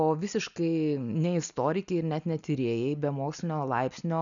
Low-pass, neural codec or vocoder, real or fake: 7.2 kHz; none; real